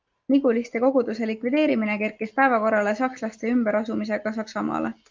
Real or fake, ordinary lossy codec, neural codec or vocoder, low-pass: real; Opus, 32 kbps; none; 7.2 kHz